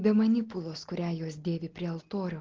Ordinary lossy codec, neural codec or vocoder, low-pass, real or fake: Opus, 16 kbps; none; 7.2 kHz; real